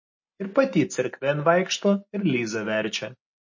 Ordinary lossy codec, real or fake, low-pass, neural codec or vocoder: MP3, 32 kbps; real; 7.2 kHz; none